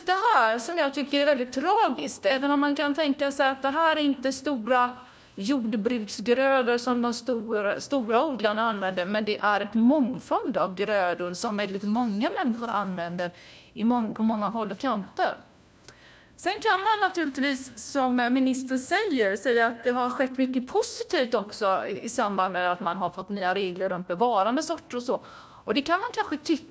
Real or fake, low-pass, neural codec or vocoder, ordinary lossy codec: fake; none; codec, 16 kHz, 1 kbps, FunCodec, trained on LibriTTS, 50 frames a second; none